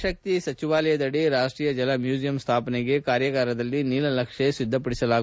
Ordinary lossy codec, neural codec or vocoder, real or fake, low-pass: none; none; real; none